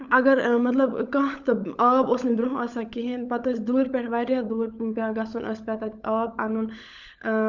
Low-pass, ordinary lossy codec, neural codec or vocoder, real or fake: 7.2 kHz; Opus, 64 kbps; codec, 16 kHz, 16 kbps, FunCodec, trained on LibriTTS, 50 frames a second; fake